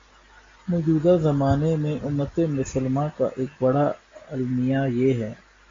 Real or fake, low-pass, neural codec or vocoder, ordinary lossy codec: real; 7.2 kHz; none; AAC, 32 kbps